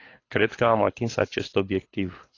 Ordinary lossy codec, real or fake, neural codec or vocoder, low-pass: AAC, 32 kbps; fake; codec, 24 kHz, 6 kbps, HILCodec; 7.2 kHz